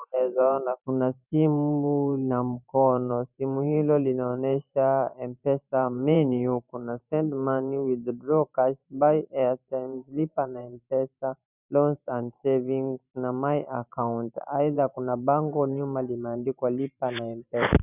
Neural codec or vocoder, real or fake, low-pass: vocoder, 44.1 kHz, 128 mel bands every 512 samples, BigVGAN v2; fake; 3.6 kHz